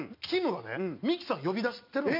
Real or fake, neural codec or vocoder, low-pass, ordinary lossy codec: real; none; 5.4 kHz; none